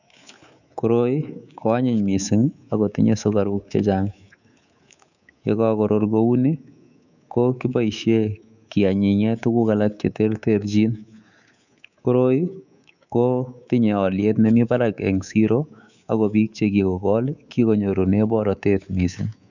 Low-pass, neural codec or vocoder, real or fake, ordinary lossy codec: 7.2 kHz; codec, 24 kHz, 3.1 kbps, DualCodec; fake; none